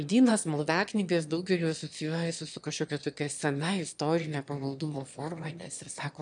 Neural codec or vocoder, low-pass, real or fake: autoencoder, 22.05 kHz, a latent of 192 numbers a frame, VITS, trained on one speaker; 9.9 kHz; fake